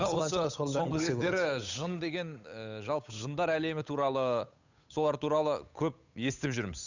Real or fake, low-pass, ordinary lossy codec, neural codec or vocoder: fake; 7.2 kHz; none; codec, 16 kHz, 8 kbps, FunCodec, trained on Chinese and English, 25 frames a second